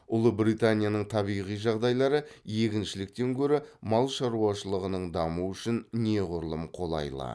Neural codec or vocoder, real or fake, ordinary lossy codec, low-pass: none; real; none; none